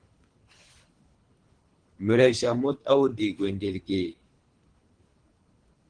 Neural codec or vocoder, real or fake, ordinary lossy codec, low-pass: codec, 24 kHz, 3 kbps, HILCodec; fake; Opus, 24 kbps; 9.9 kHz